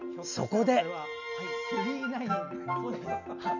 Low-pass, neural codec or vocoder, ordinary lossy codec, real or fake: 7.2 kHz; none; none; real